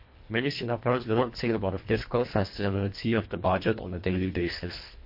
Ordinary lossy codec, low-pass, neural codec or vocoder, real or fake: MP3, 32 kbps; 5.4 kHz; codec, 24 kHz, 1.5 kbps, HILCodec; fake